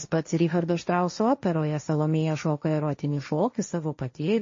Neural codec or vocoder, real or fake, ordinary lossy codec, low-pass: codec, 16 kHz, 1.1 kbps, Voila-Tokenizer; fake; MP3, 32 kbps; 7.2 kHz